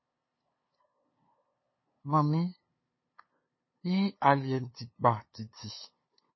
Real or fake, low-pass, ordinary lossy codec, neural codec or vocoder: fake; 7.2 kHz; MP3, 24 kbps; codec, 16 kHz, 2 kbps, FunCodec, trained on LibriTTS, 25 frames a second